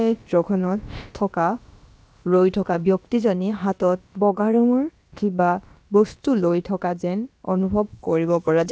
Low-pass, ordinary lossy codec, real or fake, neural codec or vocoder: none; none; fake; codec, 16 kHz, about 1 kbps, DyCAST, with the encoder's durations